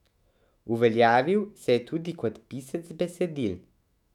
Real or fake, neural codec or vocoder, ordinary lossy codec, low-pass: fake; autoencoder, 48 kHz, 128 numbers a frame, DAC-VAE, trained on Japanese speech; none; 19.8 kHz